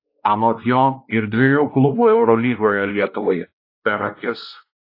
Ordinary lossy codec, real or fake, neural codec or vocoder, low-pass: AAC, 32 kbps; fake; codec, 16 kHz, 1 kbps, X-Codec, WavLM features, trained on Multilingual LibriSpeech; 5.4 kHz